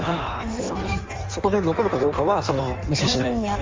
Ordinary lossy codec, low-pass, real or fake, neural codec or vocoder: Opus, 32 kbps; 7.2 kHz; fake; codec, 16 kHz in and 24 kHz out, 1.1 kbps, FireRedTTS-2 codec